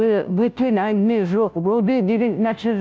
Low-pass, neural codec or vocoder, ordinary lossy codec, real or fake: none; codec, 16 kHz, 0.5 kbps, FunCodec, trained on Chinese and English, 25 frames a second; none; fake